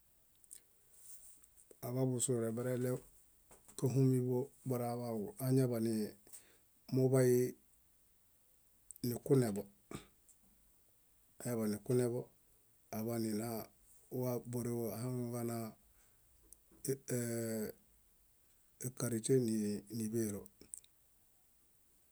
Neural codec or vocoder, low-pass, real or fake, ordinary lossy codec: none; none; real; none